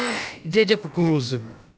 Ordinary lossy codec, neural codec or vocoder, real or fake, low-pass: none; codec, 16 kHz, about 1 kbps, DyCAST, with the encoder's durations; fake; none